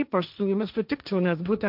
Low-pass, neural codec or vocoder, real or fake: 5.4 kHz; codec, 16 kHz, 1.1 kbps, Voila-Tokenizer; fake